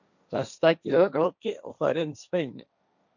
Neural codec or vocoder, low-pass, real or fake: codec, 16 kHz, 1.1 kbps, Voila-Tokenizer; 7.2 kHz; fake